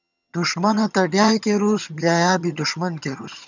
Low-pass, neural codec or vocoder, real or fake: 7.2 kHz; vocoder, 22.05 kHz, 80 mel bands, HiFi-GAN; fake